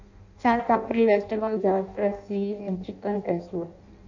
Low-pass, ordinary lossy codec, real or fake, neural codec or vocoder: 7.2 kHz; none; fake; codec, 16 kHz in and 24 kHz out, 0.6 kbps, FireRedTTS-2 codec